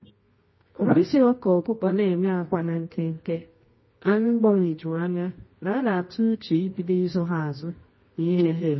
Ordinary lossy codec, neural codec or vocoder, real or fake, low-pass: MP3, 24 kbps; codec, 24 kHz, 0.9 kbps, WavTokenizer, medium music audio release; fake; 7.2 kHz